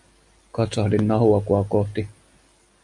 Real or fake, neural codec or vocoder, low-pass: real; none; 10.8 kHz